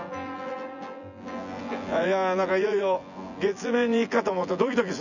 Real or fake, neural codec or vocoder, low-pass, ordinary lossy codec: fake; vocoder, 24 kHz, 100 mel bands, Vocos; 7.2 kHz; none